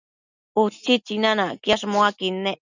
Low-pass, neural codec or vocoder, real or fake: 7.2 kHz; none; real